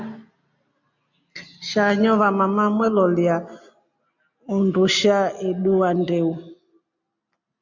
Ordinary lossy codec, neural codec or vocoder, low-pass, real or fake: MP3, 64 kbps; none; 7.2 kHz; real